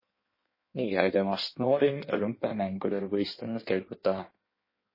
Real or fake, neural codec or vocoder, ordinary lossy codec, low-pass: fake; codec, 16 kHz in and 24 kHz out, 1.1 kbps, FireRedTTS-2 codec; MP3, 24 kbps; 5.4 kHz